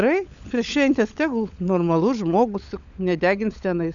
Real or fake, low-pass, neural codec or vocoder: fake; 7.2 kHz; codec, 16 kHz, 16 kbps, FunCodec, trained on LibriTTS, 50 frames a second